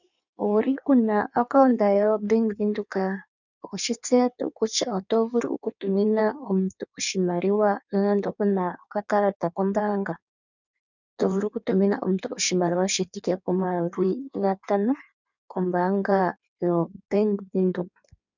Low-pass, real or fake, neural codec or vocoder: 7.2 kHz; fake; codec, 16 kHz in and 24 kHz out, 1.1 kbps, FireRedTTS-2 codec